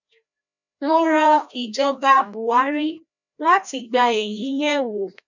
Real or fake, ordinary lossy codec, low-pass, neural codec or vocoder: fake; none; 7.2 kHz; codec, 16 kHz, 1 kbps, FreqCodec, larger model